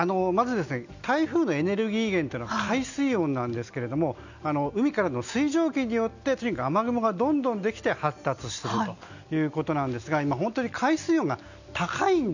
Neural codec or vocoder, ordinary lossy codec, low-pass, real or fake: none; none; 7.2 kHz; real